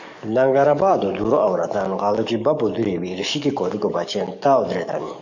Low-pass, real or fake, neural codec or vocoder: 7.2 kHz; fake; codec, 44.1 kHz, 7.8 kbps, DAC